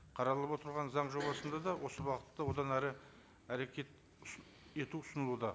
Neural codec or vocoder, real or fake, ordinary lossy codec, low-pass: none; real; none; none